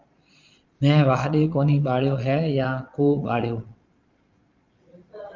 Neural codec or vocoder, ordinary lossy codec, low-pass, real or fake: vocoder, 22.05 kHz, 80 mel bands, Vocos; Opus, 24 kbps; 7.2 kHz; fake